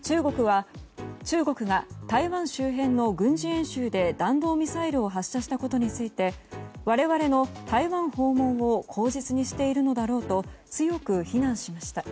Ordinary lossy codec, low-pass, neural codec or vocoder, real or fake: none; none; none; real